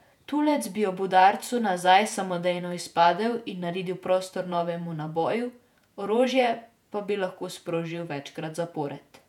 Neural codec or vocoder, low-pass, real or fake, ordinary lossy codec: vocoder, 48 kHz, 128 mel bands, Vocos; 19.8 kHz; fake; none